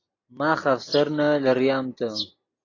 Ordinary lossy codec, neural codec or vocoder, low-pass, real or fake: AAC, 32 kbps; none; 7.2 kHz; real